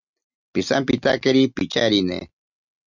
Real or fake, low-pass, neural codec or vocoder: real; 7.2 kHz; none